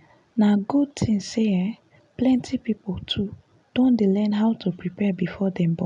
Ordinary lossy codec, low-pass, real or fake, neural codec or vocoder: none; 10.8 kHz; real; none